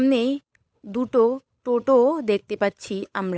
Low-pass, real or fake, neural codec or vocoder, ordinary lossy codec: none; fake; codec, 16 kHz, 8 kbps, FunCodec, trained on Chinese and English, 25 frames a second; none